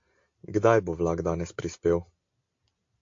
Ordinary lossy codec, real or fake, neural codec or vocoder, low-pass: AAC, 48 kbps; real; none; 7.2 kHz